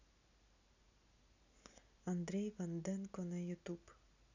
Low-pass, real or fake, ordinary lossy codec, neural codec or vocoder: 7.2 kHz; real; none; none